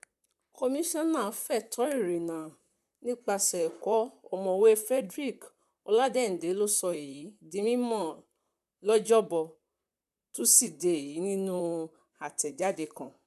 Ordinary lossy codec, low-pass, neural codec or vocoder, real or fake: none; 14.4 kHz; vocoder, 44.1 kHz, 128 mel bands, Pupu-Vocoder; fake